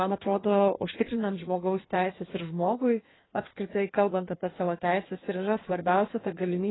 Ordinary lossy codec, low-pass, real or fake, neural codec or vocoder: AAC, 16 kbps; 7.2 kHz; fake; codec, 16 kHz in and 24 kHz out, 1.1 kbps, FireRedTTS-2 codec